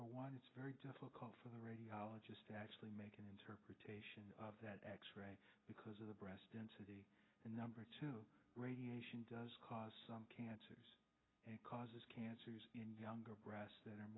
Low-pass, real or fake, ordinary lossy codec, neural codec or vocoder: 7.2 kHz; real; AAC, 16 kbps; none